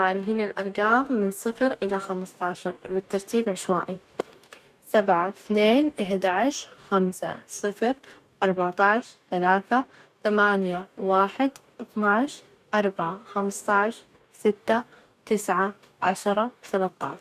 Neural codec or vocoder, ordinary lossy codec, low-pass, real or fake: codec, 44.1 kHz, 2.6 kbps, DAC; none; 14.4 kHz; fake